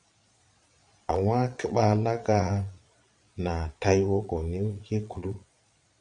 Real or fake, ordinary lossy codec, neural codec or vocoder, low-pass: fake; MP3, 48 kbps; vocoder, 22.05 kHz, 80 mel bands, Vocos; 9.9 kHz